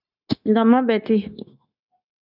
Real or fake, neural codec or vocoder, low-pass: fake; codec, 16 kHz, 0.9 kbps, LongCat-Audio-Codec; 5.4 kHz